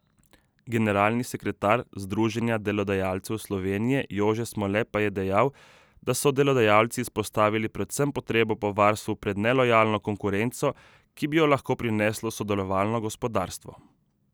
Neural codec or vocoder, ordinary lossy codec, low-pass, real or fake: none; none; none; real